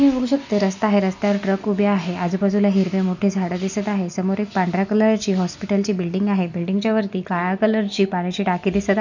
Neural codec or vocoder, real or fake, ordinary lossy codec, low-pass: none; real; none; 7.2 kHz